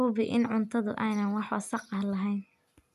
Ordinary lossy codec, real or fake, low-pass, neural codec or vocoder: none; real; 14.4 kHz; none